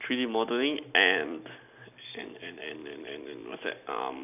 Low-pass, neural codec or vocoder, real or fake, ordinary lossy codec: 3.6 kHz; none; real; none